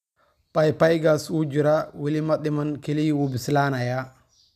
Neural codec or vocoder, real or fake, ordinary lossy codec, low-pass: none; real; none; 14.4 kHz